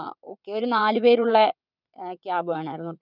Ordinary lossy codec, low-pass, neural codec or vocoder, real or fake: none; 5.4 kHz; vocoder, 22.05 kHz, 80 mel bands, WaveNeXt; fake